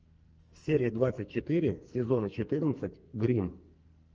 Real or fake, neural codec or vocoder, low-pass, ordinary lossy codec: fake; codec, 44.1 kHz, 2.6 kbps, SNAC; 7.2 kHz; Opus, 24 kbps